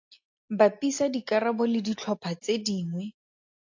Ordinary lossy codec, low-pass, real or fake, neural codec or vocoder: AAC, 48 kbps; 7.2 kHz; real; none